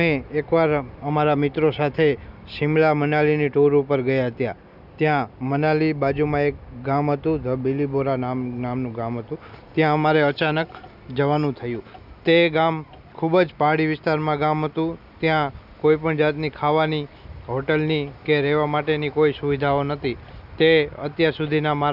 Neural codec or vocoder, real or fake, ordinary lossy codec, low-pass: none; real; none; 5.4 kHz